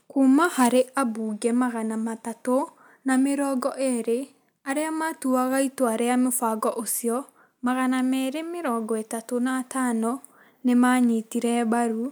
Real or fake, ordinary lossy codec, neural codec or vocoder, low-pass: real; none; none; none